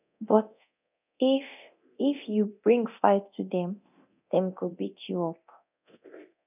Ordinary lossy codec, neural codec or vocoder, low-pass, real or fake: none; codec, 24 kHz, 0.9 kbps, DualCodec; 3.6 kHz; fake